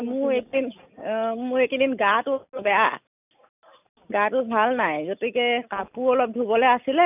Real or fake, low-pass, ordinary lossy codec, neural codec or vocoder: real; 3.6 kHz; none; none